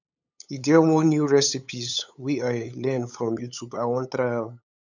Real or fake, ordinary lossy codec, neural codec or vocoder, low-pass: fake; none; codec, 16 kHz, 8 kbps, FunCodec, trained on LibriTTS, 25 frames a second; 7.2 kHz